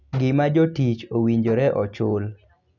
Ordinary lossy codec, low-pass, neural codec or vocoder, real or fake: none; 7.2 kHz; none; real